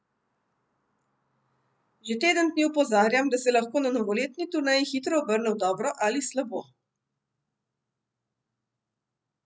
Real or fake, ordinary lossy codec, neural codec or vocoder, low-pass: real; none; none; none